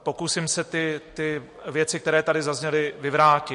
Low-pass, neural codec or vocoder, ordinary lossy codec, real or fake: 14.4 kHz; none; MP3, 48 kbps; real